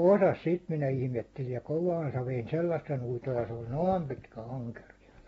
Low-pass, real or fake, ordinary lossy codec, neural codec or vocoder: 19.8 kHz; real; AAC, 24 kbps; none